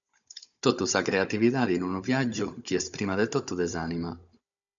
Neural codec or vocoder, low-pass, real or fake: codec, 16 kHz, 16 kbps, FunCodec, trained on Chinese and English, 50 frames a second; 7.2 kHz; fake